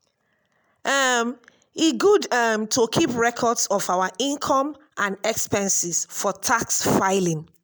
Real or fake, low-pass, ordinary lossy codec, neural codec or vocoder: real; none; none; none